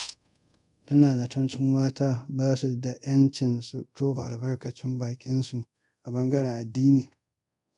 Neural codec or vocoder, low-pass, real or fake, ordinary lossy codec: codec, 24 kHz, 0.5 kbps, DualCodec; 10.8 kHz; fake; none